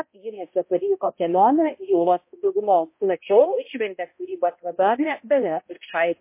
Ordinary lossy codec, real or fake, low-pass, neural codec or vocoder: MP3, 24 kbps; fake; 3.6 kHz; codec, 16 kHz, 0.5 kbps, X-Codec, HuBERT features, trained on balanced general audio